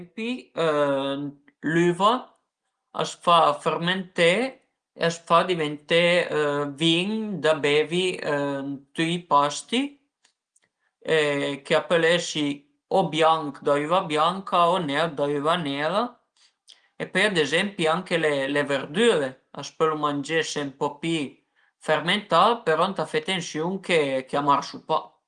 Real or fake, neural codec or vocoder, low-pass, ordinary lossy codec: real; none; 9.9 kHz; Opus, 24 kbps